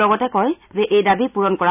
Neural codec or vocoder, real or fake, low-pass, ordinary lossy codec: none; real; 3.6 kHz; none